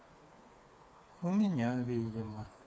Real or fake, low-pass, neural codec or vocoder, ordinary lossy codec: fake; none; codec, 16 kHz, 4 kbps, FreqCodec, smaller model; none